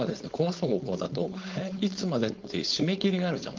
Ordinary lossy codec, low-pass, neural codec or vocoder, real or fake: Opus, 32 kbps; 7.2 kHz; codec, 16 kHz, 4.8 kbps, FACodec; fake